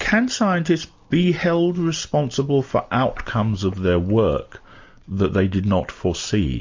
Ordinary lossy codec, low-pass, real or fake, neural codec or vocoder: MP3, 48 kbps; 7.2 kHz; real; none